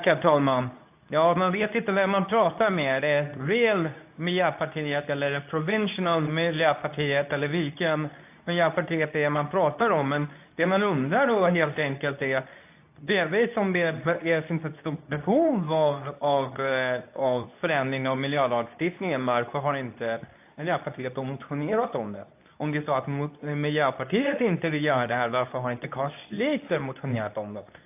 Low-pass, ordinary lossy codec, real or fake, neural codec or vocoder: 3.6 kHz; AAC, 32 kbps; fake; codec, 24 kHz, 0.9 kbps, WavTokenizer, medium speech release version 2